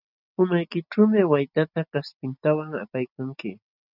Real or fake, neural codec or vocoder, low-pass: real; none; 5.4 kHz